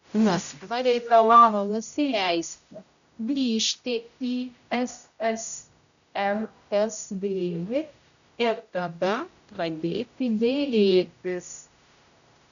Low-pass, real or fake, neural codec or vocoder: 7.2 kHz; fake; codec, 16 kHz, 0.5 kbps, X-Codec, HuBERT features, trained on general audio